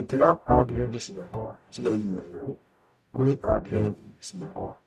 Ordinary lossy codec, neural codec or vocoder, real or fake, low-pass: none; codec, 44.1 kHz, 0.9 kbps, DAC; fake; 14.4 kHz